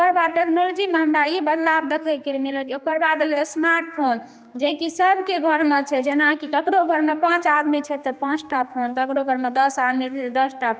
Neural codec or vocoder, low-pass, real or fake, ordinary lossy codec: codec, 16 kHz, 2 kbps, X-Codec, HuBERT features, trained on general audio; none; fake; none